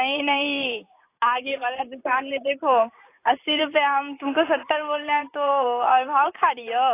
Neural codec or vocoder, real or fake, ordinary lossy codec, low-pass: vocoder, 44.1 kHz, 128 mel bands, Pupu-Vocoder; fake; AAC, 24 kbps; 3.6 kHz